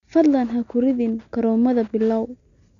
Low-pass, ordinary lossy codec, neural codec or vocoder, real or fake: 7.2 kHz; none; none; real